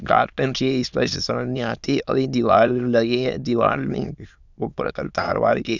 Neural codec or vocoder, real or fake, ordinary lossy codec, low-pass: autoencoder, 22.05 kHz, a latent of 192 numbers a frame, VITS, trained on many speakers; fake; none; 7.2 kHz